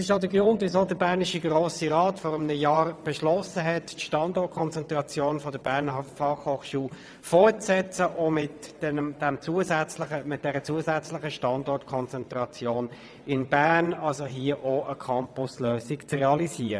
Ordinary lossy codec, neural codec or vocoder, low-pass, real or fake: none; vocoder, 22.05 kHz, 80 mel bands, WaveNeXt; none; fake